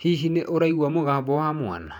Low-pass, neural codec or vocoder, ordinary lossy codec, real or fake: 19.8 kHz; vocoder, 48 kHz, 128 mel bands, Vocos; none; fake